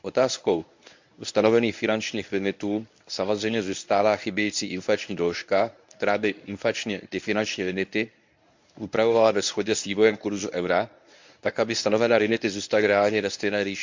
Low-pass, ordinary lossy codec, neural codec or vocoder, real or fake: 7.2 kHz; MP3, 64 kbps; codec, 24 kHz, 0.9 kbps, WavTokenizer, medium speech release version 1; fake